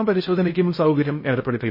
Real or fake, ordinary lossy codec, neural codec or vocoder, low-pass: fake; MP3, 24 kbps; codec, 16 kHz in and 24 kHz out, 0.6 kbps, FocalCodec, streaming, 2048 codes; 5.4 kHz